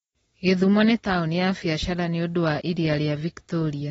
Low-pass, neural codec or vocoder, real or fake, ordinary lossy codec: 19.8 kHz; none; real; AAC, 24 kbps